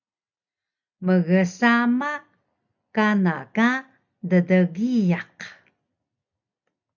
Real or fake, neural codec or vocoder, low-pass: real; none; 7.2 kHz